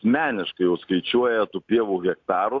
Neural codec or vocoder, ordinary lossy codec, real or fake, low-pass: none; AAC, 48 kbps; real; 7.2 kHz